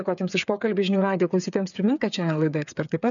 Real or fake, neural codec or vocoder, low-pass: fake; codec, 16 kHz, 8 kbps, FreqCodec, smaller model; 7.2 kHz